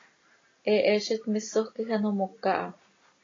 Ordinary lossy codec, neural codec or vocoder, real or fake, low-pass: AAC, 32 kbps; none; real; 7.2 kHz